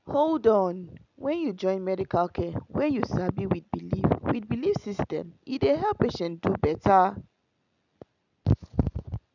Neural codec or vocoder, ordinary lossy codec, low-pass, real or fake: none; none; 7.2 kHz; real